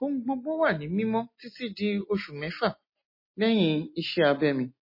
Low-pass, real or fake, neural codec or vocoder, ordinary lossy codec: 5.4 kHz; real; none; MP3, 24 kbps